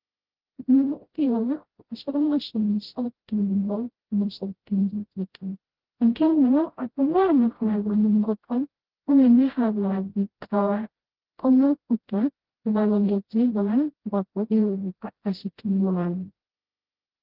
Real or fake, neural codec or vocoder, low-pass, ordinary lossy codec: fake; codec, 16 kHz, 0.5 kbps, FreqCodec, smaller model; 5.4 kHz; Opus, 16 kbps